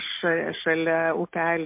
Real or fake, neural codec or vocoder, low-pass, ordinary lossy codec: real; none; 3.6 kHz; MP3, 24 kbps